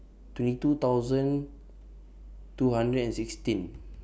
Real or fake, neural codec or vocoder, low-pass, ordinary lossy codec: real; none; none; none